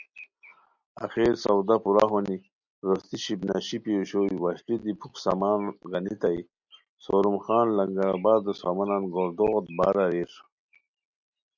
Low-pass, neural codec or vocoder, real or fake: 7.2 kHz; none; real